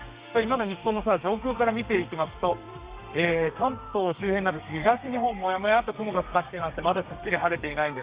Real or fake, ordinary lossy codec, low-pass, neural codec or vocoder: fake; Opus, 64 kbps; 3.6 kHz; codec, 32 kHz, 1.9 kbps, SNAC